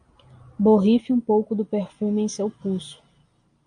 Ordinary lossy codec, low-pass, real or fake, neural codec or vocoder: MP3, 96 kbps; 9.9 kHz; real; none